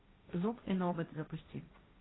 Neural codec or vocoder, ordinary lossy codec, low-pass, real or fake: codec, 16 kHz, 1.1 kbps, Voila-Tokenizer; AAC, 16 kbps; 7.2 kHz; fake